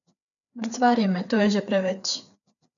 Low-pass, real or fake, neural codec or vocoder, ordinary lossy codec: 7.2 kHz; fake; codec, 16 kHz, 4 kbps, FreqCodec, larger model; none